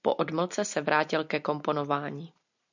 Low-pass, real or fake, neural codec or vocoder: 7.2 kHz; real; none